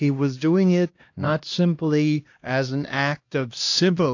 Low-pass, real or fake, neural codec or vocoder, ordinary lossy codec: 7.2 kHz; fake; codec, 16 kHz, 1 kbps, X-Codec, HuBERT features, trained on LibriSpeech; AAC, 48 kbps